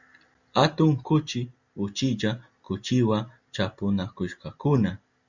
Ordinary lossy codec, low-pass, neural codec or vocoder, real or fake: Opus, 64 kbps; 7.2 kHz; none; real